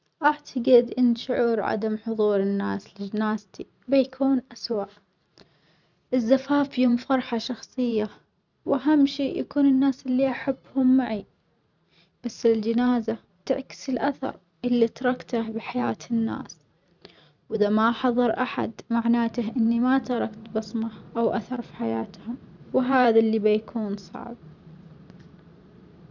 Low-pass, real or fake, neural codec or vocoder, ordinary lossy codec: 7.2 kHz; fake; vocoder, 44.1 kHz, 128 mel bands every 512 samples, BigVGAN v2; none